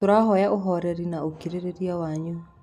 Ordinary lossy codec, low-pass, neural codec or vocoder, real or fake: none; 14.4 kHz; none; real